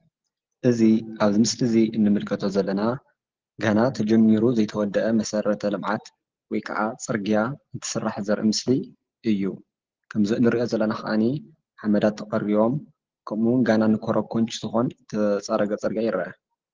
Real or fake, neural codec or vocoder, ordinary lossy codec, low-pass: real; none; Opus, 16 kbps; 7.2 kHz